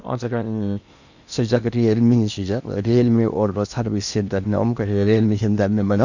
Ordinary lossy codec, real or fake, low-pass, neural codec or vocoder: none; fake; 7.2 kHz; codec, 16 kHz in and 24 kHz out, 0.8 kbps, FocalCodec, streaming, 65536 codes